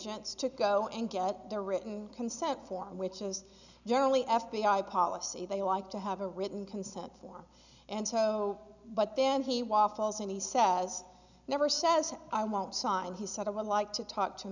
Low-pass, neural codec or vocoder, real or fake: 7.2 kHz; none; real